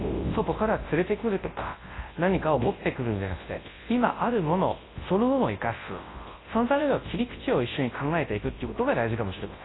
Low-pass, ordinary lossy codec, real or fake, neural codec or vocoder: 7.2 kHz; AAC, 16 kbps; fake; codec, 24 kHz, 0.9 kbps, WavTokenizer, large speech release